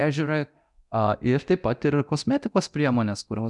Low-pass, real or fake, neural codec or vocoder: 10.8 kHz; fake; codec, 24 kHz, 0.9 kbps, DualCodec